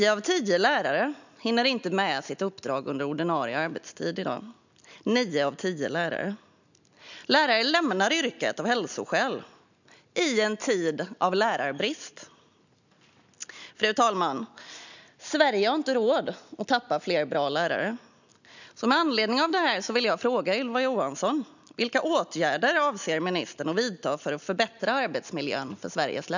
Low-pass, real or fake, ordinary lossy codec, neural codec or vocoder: 7.2 kHz; real; none; none